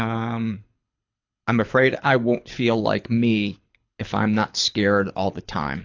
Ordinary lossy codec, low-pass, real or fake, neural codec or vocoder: AAC, 48 kbps; 7.2 kHz; fake; codec, 24 kHz, 6 kbps, HILCodec